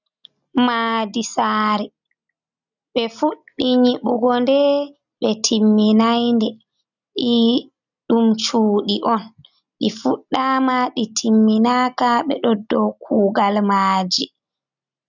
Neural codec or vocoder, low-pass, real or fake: none; 7.2 kHz; real